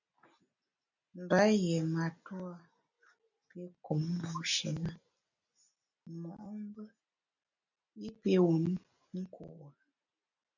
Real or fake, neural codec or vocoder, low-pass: real; none; 7.2 kHz